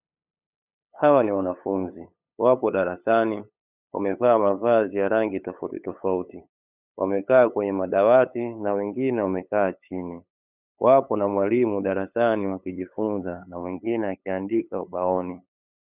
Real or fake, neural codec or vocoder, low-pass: fake; codec, 16 kHz, 8 kbps, FunCodec, trained on LibriTTS, 25 frames a second; 3.6 kHz